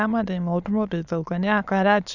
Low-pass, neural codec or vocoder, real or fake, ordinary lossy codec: 7.2 kHz; autoencoder, 22.05 kHz, a latent of 192 numbers a frame, VITS, trained on many speakers; fake; none